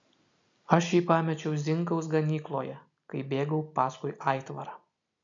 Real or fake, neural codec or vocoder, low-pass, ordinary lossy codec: real; none; 7.2 kHz; AAC, 64 kbps